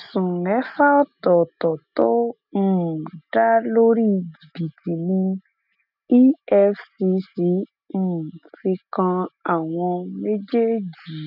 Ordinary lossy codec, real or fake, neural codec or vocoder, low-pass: none; real; none; 5.4 kHz